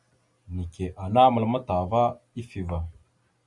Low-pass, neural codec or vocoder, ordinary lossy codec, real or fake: 10.8 kHz; none; Opus, 64 kbps; real